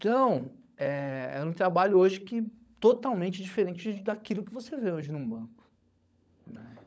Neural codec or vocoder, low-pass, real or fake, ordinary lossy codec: codec, 16 kHz, 16 kbps, FunCodec, trained on LibriTTS, 50 frames a second; none; fake; none